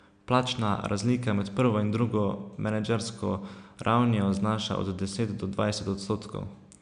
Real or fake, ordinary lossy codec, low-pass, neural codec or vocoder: real; none; 9.9 kHz; none